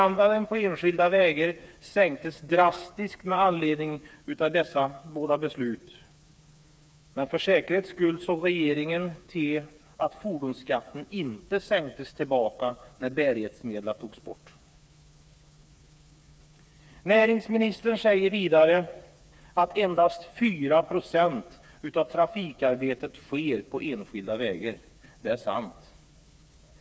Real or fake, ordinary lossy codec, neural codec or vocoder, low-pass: fake; none; codec, 16 kHz, 4 kbps, FreqCodec, smaller model; none